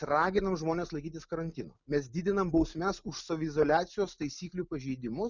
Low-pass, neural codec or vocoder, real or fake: 7.2 kHz; none; real